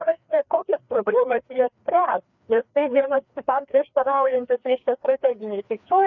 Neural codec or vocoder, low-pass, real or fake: codec, 16 kHz, 2 kbps, FreqCodec, larger model; 7.2 kHz; fake